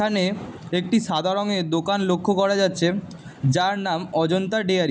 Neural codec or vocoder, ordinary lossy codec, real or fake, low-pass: none; none; real; none